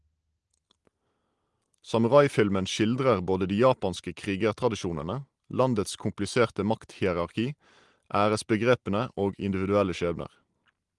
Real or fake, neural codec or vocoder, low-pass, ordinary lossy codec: real; none; 10.8 kHz; Opus, 24 kbps